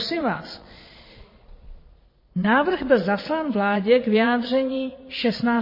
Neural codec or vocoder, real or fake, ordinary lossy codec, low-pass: vocoder, 22.05 kHz, 80 mel bands, Vocos; fake; MP3, 24 kbps; 5.4 kHz